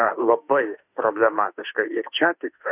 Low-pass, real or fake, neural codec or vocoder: 3.6 kHz; fake; autoencoder, 48 kHz, 32 numbers a frame, DAC-VAE, trained on Japanese speech